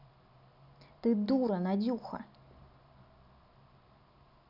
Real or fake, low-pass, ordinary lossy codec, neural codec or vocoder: real; 5.4 kHz; none; none